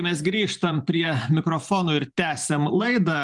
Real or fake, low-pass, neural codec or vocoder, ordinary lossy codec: fake; 10.8 kHz; vocoder, 44.1 kHz, 128 mel bands every 512 samples, BigVGAN v2; Opus, 32 kbps